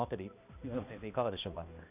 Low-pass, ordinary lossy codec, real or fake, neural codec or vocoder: 3.6 kHz; none; fake; codec, 16 kHz, 1 kbps, X-Codec, HuBERT features, trained on balanced general audio